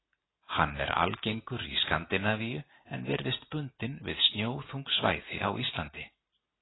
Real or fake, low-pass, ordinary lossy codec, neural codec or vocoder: real; 7.2 kHz; AAC, 16 kbps; none